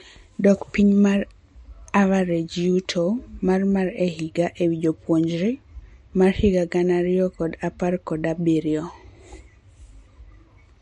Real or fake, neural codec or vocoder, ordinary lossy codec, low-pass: real; none; MP3, 48 kbps; 19.8 kHz